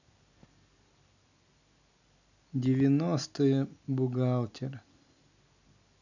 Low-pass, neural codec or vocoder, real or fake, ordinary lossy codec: 7.2 kHz; none; real; none